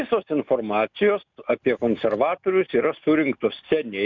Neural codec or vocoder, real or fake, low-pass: none; real; 7.2 kHz